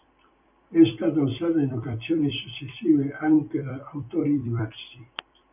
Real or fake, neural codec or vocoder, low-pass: fake; vocoder, 44.1 kHz, 128 mel bands every 256 samples, BigVGAN v2; 3.6 kHz